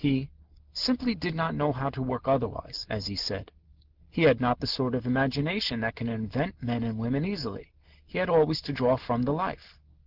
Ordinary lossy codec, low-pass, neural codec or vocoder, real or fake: Opus, 16 kbps; 5.4 kHz; none; real